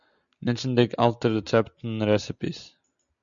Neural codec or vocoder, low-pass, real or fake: none; 7.2 kHz; real